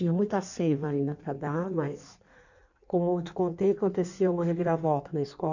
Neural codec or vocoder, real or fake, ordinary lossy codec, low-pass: codec, 16 kHz in and 24 kHz out, 1.1 kbps, FireRedTTS-2 codec; fake; none; 7.2 kHz